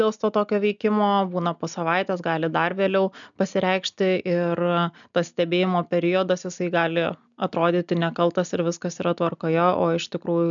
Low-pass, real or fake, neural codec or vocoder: 7.2 kHz; real; none